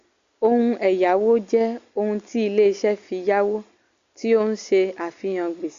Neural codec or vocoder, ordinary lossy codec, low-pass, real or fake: none; Opus, 64 kbps; 7.2 kHz; real